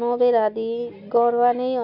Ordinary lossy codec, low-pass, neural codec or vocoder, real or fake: none; 5.4 kHz; none; real